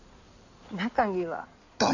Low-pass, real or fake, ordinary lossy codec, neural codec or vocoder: 7.2 kHz; fake; none; codec, 16 kHz in and 24 kHz out, 2.2 kbps, FireRedTTS-2 codec